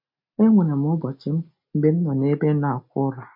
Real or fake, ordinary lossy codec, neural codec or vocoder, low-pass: real; MP3, 32 kbps; none; 5.4 kHz